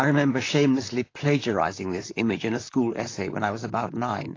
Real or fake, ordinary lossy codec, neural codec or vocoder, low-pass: fake; AAC, 32 kbps; vocoder, 44.1 kHz, 128 mel bands, Pupu-Vocoder; 7.2 kHz